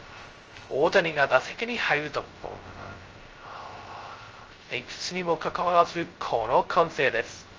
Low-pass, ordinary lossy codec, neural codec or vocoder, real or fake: 7.2 kHz; Opus, 24 kbps; codec, 16 kHz, 0.2 kbps, FocalCodec; fake